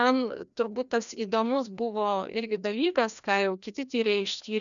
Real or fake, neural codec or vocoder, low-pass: fake; codec, 16 kHz, 1 kbps, FreqCodec, larger model; 7.2 kHz